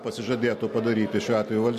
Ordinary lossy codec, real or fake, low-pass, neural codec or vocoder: MP3, 64 kbps; real; 14.4 kHz; none